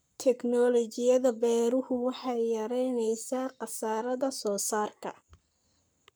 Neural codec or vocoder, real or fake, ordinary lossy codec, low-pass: codec, 44.1 kHz, 7.8 kbps, Pupu-Codec; fake; none; none